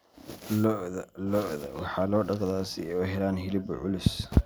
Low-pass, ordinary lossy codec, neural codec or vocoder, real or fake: none; none; none; real